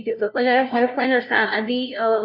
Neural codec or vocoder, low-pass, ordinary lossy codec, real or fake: codec, 16 kHz, 0.5 kbps, FunCodec, trained on LibriTTS, 25 frames a second; 5.4 kHz; none; fake